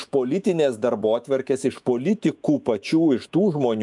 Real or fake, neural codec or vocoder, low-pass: fake; autoencoder, 48 kHz, 128 numbers a frame, DAC-VAE, trained on Japanese speech; 10.8 kHz